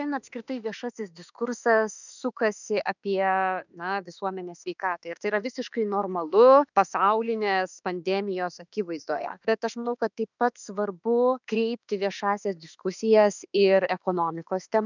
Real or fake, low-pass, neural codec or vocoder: fake; 7.2 kHz; autoencoder, 48 kHz, 32 numbers a frame, DAC-VAE, trained on Japanese speech